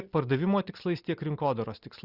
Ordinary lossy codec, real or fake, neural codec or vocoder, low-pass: Opus, 64 kbps; real; none; 5.4 kHz